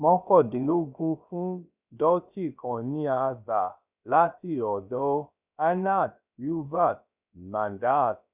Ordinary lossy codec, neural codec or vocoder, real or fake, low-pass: none; codec, 16 kHz, about 1 kbps, DyCAST, with the encoder's durations; fake; 3.6 kHz